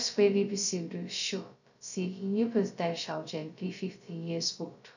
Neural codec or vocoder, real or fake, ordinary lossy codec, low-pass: codec, 16 kHz, 0.2 kbps, FocalCodec; fake; none; 7.2 kHz